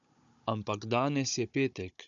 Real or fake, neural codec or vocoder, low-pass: fake; codec, 16 kHz, 16 kbps, FunCodec, trained on Chinese and English, 50 frames a second; 7.2 kHz